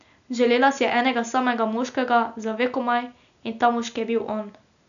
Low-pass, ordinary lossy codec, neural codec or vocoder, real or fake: 7.2 kHz; none; none; real